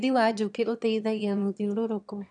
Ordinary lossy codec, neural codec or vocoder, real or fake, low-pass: none; autoencoder, 22.05 kHz, a latent of 192 numbers a frame, VITS, trained on one speaker; fake; 9.9 kHz